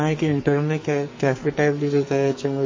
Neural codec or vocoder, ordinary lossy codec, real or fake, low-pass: codec, 44.1 kHz, 2.6 kbps, SNAC; MP3, 32 kbps; fake; 7.2 kHz